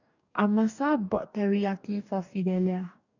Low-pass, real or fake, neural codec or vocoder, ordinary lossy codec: 7.2 kHz; fake; codec, 44.1 kHz, 2.6 kbps, DAC; none